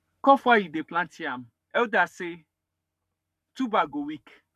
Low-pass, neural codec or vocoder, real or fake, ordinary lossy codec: 14.4 kHz; codec, 44.1 kHz, 7.8 kbps, Pupu-Codec; fake; none